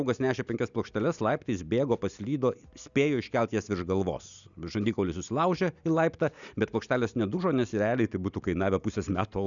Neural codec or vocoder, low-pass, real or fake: none; 7.2 kHz; real